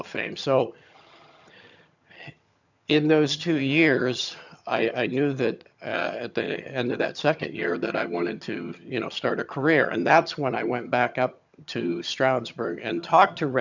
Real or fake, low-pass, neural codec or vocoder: fake; 7.2 kHz; vocoder, 22.05 kHz, 80 mel bands, HiFi-GAN